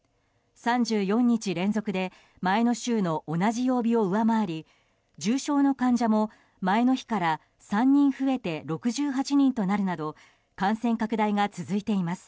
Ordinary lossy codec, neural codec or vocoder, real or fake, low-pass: none; none; real; none